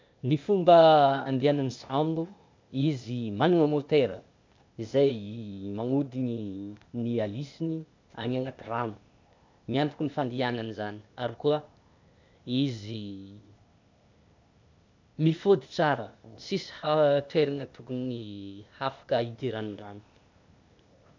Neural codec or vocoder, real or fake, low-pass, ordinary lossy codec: codec, 16 kHz, 0.8 kbps, ZipCodec; fake; 7.2 kHz; MP3, 64 kbps